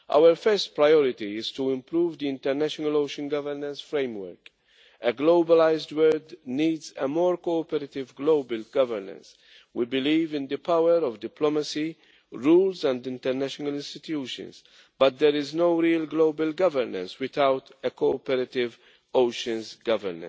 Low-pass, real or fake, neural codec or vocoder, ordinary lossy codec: none; real; none; none